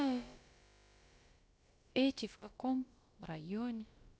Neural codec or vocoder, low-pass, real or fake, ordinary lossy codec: codec, 16 kHz, about 1 kbps, DyCAST, with the encoder's durations; none; fake; none